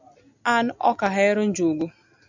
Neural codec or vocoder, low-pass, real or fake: none; 7.2 kHz; real